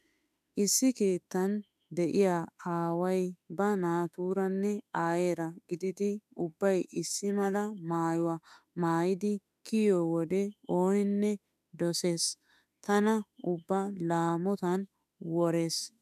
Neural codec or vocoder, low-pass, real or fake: autoencoder, 48 kHz, 32 numbers a frame, DAC-VAE, trained on Japanese speech; 14.4 kHz; fake